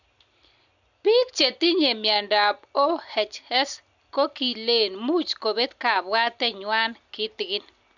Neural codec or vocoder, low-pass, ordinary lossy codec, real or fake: none; 7.2 kHz; none; real